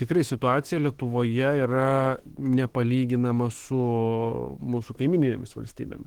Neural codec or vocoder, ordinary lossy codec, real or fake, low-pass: autoencoder, 48 kHz, 32 numbers a frame, DAC-VAE, trained on Japanese speech; Opus, 16 kbps; fake; 19.8 kHz